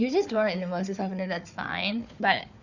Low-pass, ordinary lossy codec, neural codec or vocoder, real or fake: 7.2 kHz; none; codec, 16 kHz, 4 kbps, FunCodec, trained on Chinese and English, 50 frames a second; fake